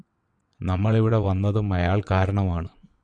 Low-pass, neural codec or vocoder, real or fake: 9.9 kHz; vocoder, 22.05 kHz, 80 mel bands, WaveNeXt; fake